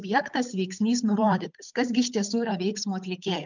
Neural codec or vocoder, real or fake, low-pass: codec, 16 kHz, 8 kbps, FunCodec, trained on Chinese and English, 25 frames a second; fake; 7.2 kHz